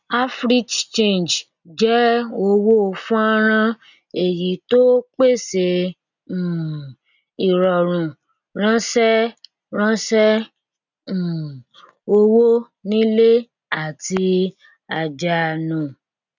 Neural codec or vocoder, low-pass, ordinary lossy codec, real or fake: none; 7.2 kHz; none; real